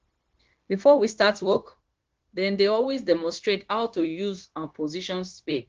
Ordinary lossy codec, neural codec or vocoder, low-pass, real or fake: Opus, 16 kbps; codec, 16 kHz, 0.9 kbps, LongCat-Audio-Codec; 7.2 kHz; fake